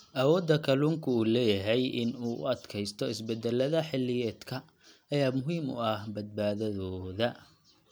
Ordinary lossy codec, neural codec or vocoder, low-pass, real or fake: none; none; none; real